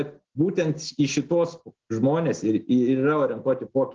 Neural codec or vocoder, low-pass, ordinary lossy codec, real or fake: none; 7.2 kHz; Opus, 16 kbps; real